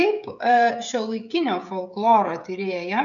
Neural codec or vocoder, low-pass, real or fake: codec, 16 kHz, 16 kbps, FreqCodec, smaller model; 7.2 kHz; fake